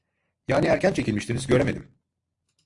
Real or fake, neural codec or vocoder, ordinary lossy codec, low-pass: real; none; AAC, 64 kbps; 10.8 kHz